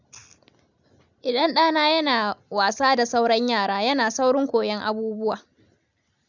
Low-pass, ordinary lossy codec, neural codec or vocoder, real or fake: 7.2 kHz; none; none; real